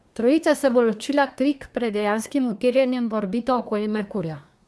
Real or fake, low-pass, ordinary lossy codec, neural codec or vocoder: fake; none; none; codec, 24 kHz, 1 kbps, SNAC